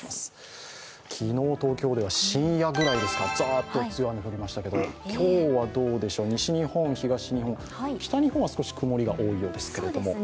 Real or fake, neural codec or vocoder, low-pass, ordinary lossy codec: real; none; none; none